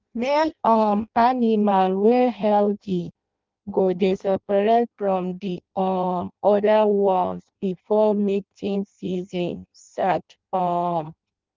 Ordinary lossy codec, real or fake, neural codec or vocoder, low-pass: Opus, 32 kbps; fake; codec, 16 kHz in and 24 kHz out, 0.6 kbps, FireRedTTS-2 codec; 7.2 kHz